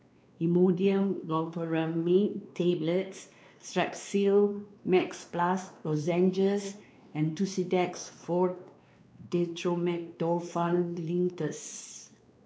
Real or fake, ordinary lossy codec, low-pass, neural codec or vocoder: fake; none; none; codec, 16 kHz, 2 kbps, X-Codec, WavLM features, trained on Multilingual LibriSpeech